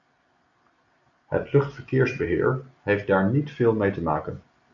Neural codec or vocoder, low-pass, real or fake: none; 7.2 kHz; real